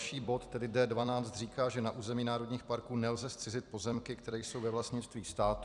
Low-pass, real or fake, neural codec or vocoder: 10.8 kHz; fake; vocoder, 44.1 kHz, 128 mel bands every 256 samples, BigVGAN v2